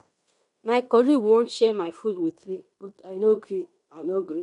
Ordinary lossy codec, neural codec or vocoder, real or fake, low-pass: MP3, 64 kbps; codec, 16 kHz in and 24 kHz out, 0.9 kbps, LongCat-Audio-Codec, fine tuned four codebook decoder; fake; 10.8 kHz